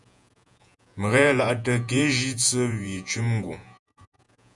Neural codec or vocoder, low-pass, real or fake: vocoder, 48 kHz, 128 mel bands, Vocos; 10.8 kHz; fake